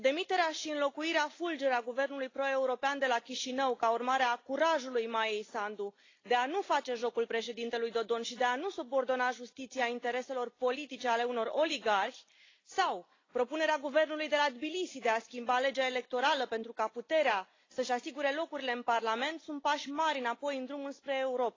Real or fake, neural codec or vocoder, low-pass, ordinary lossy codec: real; none; 7.2 kHz; AAC, 32 kbps